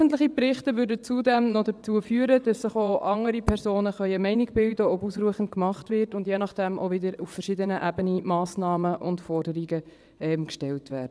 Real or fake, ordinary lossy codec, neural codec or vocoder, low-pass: fake; none; vocoder, 22.05 kHz, 80 mel bands, WaveNeXt; none